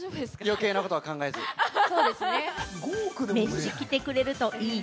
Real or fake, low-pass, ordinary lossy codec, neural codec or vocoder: real; none; none; none